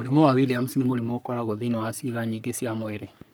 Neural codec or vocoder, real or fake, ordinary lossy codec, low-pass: codec, 44.1 kHz, 3.4 kbps, Pupu-Codec; fake; none; none